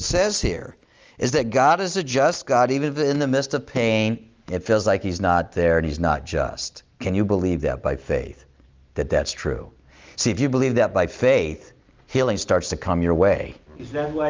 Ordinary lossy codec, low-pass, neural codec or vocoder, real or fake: Opus, 24 kbps; 7.2 kHz; none; real